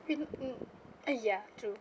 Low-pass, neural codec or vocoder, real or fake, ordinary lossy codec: none; none; real; none